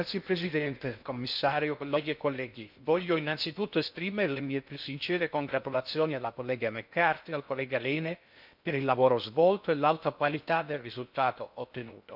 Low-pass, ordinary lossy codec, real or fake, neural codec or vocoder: 5.4 kHz; none; fake; codec, 16 kHz in and 24 kHz out, 0.6 kbps, FocalCodec, streaming, 2048 codes